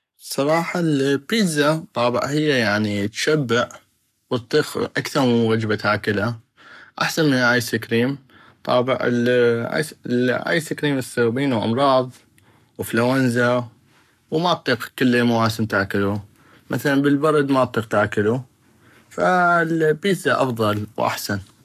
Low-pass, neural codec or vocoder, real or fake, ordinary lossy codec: 14.4 kHz; codec, 44.1 kHz, 7.8 kbps, Pupu-Codec; fake; none